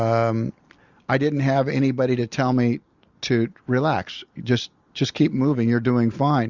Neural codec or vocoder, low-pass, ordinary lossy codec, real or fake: none; 7.2 kHz; Opus, 64 kbps; real